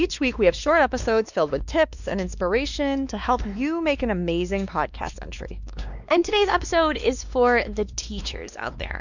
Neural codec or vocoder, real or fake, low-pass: codec, 16 kHz, 2 kbps, X-Codec, WavLM features, trained on Multilingual LibriSpeech; fake; 7.2 kHz